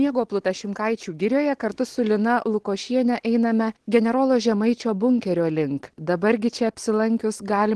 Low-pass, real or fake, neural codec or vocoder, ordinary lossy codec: 10.8 kHz; real; none; Opus, 16 kbps